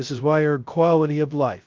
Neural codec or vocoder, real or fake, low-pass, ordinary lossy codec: codec, 16 kHz, 0.2 kbps, FocalCodec; fake; 7.2 kHz; Opus, 32 kbps